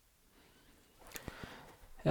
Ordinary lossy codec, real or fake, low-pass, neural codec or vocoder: none; fake; 19.8 kHz; vocoder, 44.1 kHz, 128 mel bands every 512 samples, BigVGAN v2